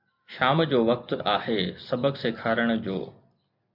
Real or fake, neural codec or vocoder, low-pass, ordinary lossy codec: real; none; 5.4 kHz; MP3, 48 kbps